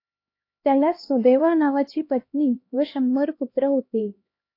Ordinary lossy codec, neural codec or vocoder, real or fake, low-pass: AAC, 32 kbps; codec, 16 kHz, 1 kbps, X-Codec, HuBERT features, trained on LibriSpeech; fake; 5.4 kHz